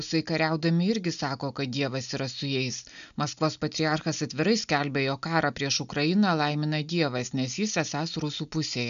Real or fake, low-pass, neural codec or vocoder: real; 7.2 kHz; none